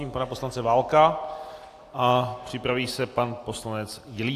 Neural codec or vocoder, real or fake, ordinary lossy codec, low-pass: none; real; AAC, 64 kbps; 14.4 kHz